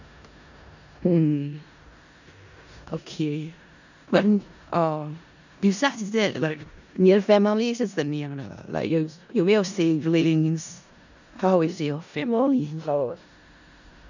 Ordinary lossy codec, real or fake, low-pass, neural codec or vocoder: none; fake; 7.2 kHz; codec, 16 kHz in and 24 kHz out, 0.4 kbps, LongCat-Audio-Codec, four codebook decoder